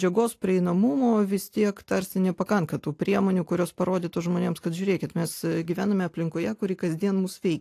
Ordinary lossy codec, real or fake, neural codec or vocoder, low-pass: AAC, 64 kbps; real; none; 14.4 kHz